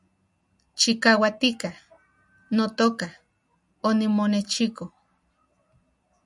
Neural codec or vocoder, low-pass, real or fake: none; 10.8 kHz; real